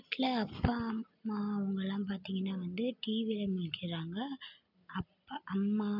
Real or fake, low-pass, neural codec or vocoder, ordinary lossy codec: real; 5.4 kHz; none; none